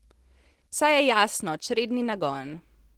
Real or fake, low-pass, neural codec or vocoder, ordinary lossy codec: real; 19.8 kHz; none; Opus, 16 kbps